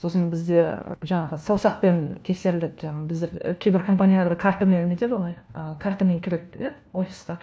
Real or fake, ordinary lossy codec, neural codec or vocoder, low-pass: fake; none; codec, 16 kHz, 1 kbps, FunCodec, trained on LibriTTS, 50 frames a second; none